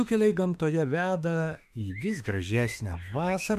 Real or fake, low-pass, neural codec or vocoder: fake; 14.4 kHz; autoencoder, 48 kHz, 32 numbers a frame, DAC-VAE, trained on Japanese speech